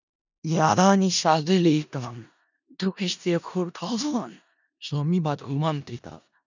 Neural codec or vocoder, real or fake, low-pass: codec, 16 kHz in and 24 kHz out, 0.4 kbps, LongCat-Audio-Codec, four codebook decoder; fake; 7.2 kHz